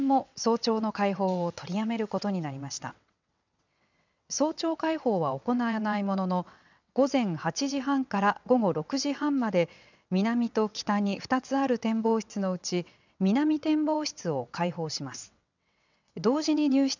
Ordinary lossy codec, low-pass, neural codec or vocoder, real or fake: none; 7.2 kHz; vocoder, 22.05 kHz, 80 mel bands, WaveNeXt; fake